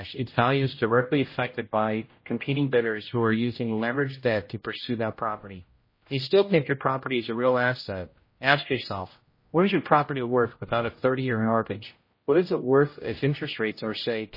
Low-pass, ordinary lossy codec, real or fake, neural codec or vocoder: 5.4 kHz; MP3, 24 kbps; fake; codec, 16 kHz, 0.5 kbps, X-Codec, HuBERT features, trained on general audio